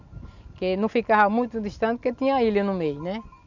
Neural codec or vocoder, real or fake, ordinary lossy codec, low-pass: none; real; none; 7.2 kHz